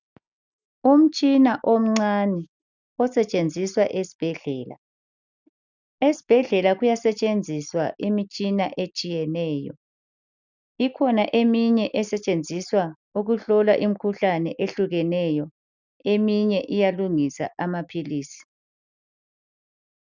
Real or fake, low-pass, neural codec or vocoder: real; 7.2 kHz; none